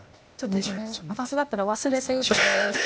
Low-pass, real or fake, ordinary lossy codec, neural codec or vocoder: none; fake; none; codec, 16 kHz, 0.8 kbps, ZipCodec